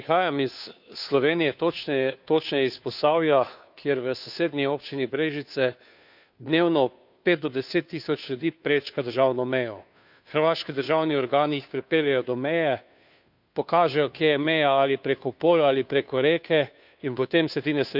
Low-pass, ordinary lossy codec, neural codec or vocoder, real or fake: 5.4 kHz; none; codec, 16 kHz, 2 kbps, FunCodec, trained on Chinese and English, 25 frames a second; fake